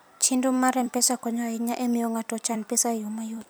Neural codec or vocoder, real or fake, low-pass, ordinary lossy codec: vocoder, 44.1 kHz, 128 mel bands every 256 samples, BigVGAN v2; fake; none; none